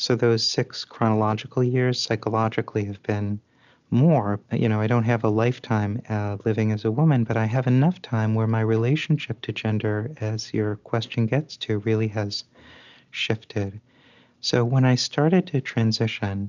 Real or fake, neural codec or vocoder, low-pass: real; none; 7.2 kHz